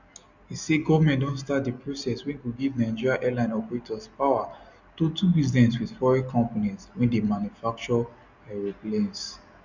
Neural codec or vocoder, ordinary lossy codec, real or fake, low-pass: none; none; real; 7.2 kHz